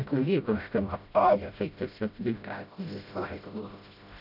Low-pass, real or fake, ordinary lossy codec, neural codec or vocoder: 5.4 kHz; fake; none; codec, 16 kHz, 0.5 kbps, FreqCodec, smaller model